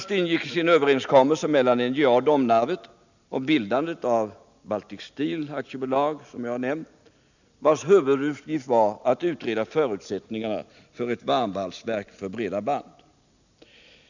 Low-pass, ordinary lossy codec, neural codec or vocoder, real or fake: 7.2 kHz; none; none; real